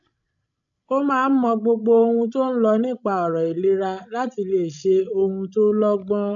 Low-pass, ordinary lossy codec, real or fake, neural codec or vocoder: 7.2 kHz; none; fake; codec, 16 kHz, 16 kbps, FreqCodec, larger model